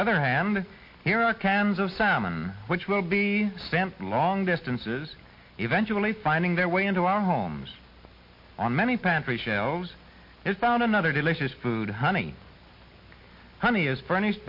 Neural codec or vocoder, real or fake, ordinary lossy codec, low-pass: none; real; MP3, 32 kbps; 5.4 kHz